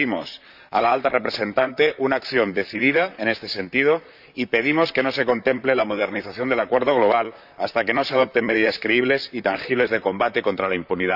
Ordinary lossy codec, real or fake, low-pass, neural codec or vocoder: none; fake; 5.4 kHz; vocoder, 44.1 kHz, 128 mel bands, Pupu-Vocoder